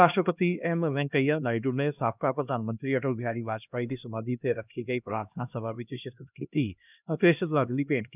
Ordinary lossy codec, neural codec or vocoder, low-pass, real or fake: none; codec, 16 kHz, 1 kbps, X-Codec, HuBERT features, trained on LibriSpeech; 3.6 kHz; fake